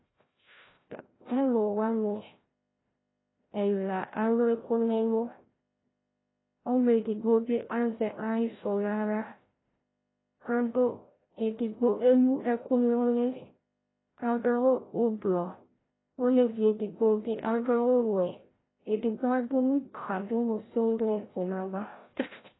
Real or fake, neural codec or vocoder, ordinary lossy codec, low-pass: fake; codec, 16 kHz, 0.5 kbps, FreqCodec, larger model; AAC, 16 kbps; 7.2 kHz